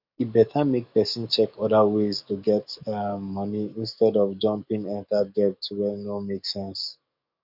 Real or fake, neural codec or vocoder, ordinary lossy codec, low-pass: fake; codec, 44.1 kHz, 7.8 kbps, DAC; none; 5.4 kHz